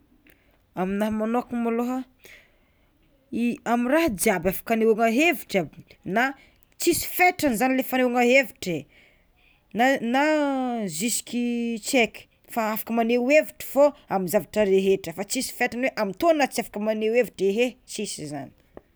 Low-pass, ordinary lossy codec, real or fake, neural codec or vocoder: none; none; real; none